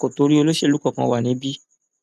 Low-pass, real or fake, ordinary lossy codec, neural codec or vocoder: 14.4 kHz; real; none; none